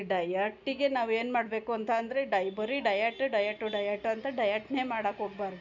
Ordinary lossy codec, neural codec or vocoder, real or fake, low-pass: none; none; real; 7.2 kHz